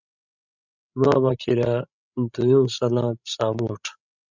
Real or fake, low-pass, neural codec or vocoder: fake; 7.2 kHz; codec, 16 kHz, 16 kbps, FreqCodec, larger model